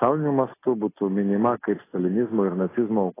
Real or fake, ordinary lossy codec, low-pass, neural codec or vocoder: real; AAC, 16 kbps; 3.6 kHz; none